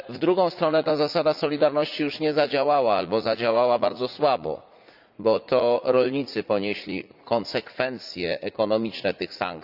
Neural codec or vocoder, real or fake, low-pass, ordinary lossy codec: vocoder, 44.1 kHz, 80 mel bands, Vocos; fake; 5.4 kHz; Opus, 64 kbps